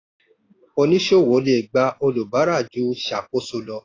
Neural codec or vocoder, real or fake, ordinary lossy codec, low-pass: none; real; AAC, 32 kbps; 7.2 kHz